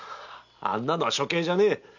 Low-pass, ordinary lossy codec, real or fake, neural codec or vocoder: 7.2 kHz; none; real; none